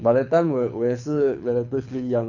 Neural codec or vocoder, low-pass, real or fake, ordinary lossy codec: codec, 24 kHz, 6 kbps, HILCodec; 7.2 kHz; fake; none